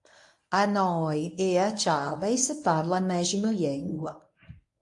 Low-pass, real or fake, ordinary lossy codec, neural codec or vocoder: 10.8 kHz; fake; AAC, 64 kbps; codec, 24 kHz, 0.9 kbps, WavTokenizer, medium speech release version 1